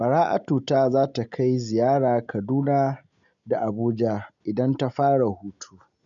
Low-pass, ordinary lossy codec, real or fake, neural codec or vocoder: 7.2 kHz; none; real; none